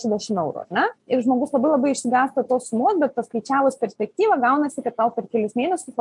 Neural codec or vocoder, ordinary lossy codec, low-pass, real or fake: none; MP3, 64 kbps; 10.8 kHz; real